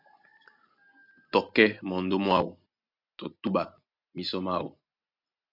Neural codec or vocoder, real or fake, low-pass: none; real; 5.4 kHz